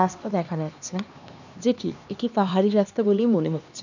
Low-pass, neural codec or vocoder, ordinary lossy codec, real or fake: 7.2 kHz; codec, 16 kHz, 2 kbps, X-Codec, HuBERT features, trained on LibriSpeech; none; fake